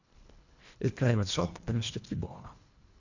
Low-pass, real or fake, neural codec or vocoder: 7.2 kHz; fake; codec, 24 kHz, 1.5 kbps, HILCodec